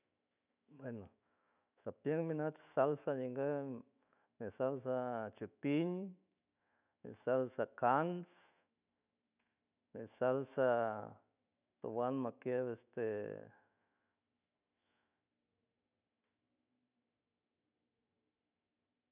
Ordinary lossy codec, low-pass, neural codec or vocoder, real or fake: none; 3.6 kHz; autoencoder, 48 kHz, 128 numbers a frame, DAC-VAE, trained on Japanese speech; fake